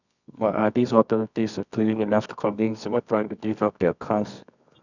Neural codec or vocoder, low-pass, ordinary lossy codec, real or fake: codec, 24 kHz, 0.9 kbps, WavTokenizer, medium music audio release; 7.2 kHz; none; fake